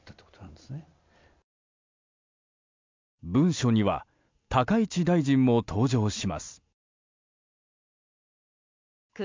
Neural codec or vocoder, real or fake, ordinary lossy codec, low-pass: none; real; MP3, 64 kbps; 7.2 kHz